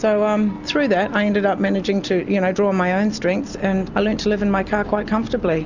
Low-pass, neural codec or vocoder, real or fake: 7.2 kHz; none; real